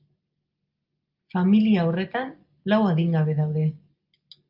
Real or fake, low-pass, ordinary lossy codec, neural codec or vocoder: real; 5.4 kHz; Opus, 24 kbps; none